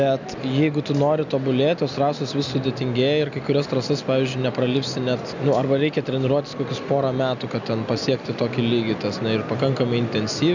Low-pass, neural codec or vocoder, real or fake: 7.2 kHz; none; real